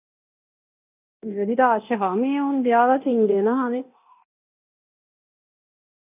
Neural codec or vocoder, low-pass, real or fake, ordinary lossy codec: codec, 24 kHz, 0.9 kbps, DualCodec; 3.6 kHz; fake; none